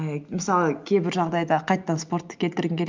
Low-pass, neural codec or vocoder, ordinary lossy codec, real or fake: 7.2 kHz; none; Opus, 32 kbps; real